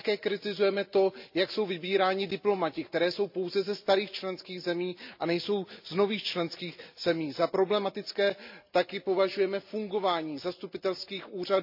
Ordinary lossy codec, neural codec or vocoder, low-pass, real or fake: MP3, 48 kbps; none; 5.4 kHz; real